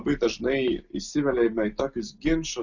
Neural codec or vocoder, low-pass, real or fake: none; 7.2 kHz; real